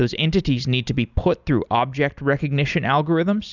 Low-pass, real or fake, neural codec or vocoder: 7.2 kHz; real; none